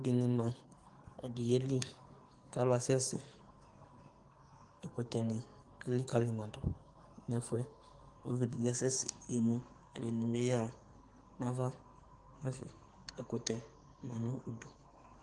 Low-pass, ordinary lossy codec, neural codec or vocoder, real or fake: 10.8 kHz; Opus, 24 kbps; codec, 44.1 kHz, 2.6 kbps, SNAC; fake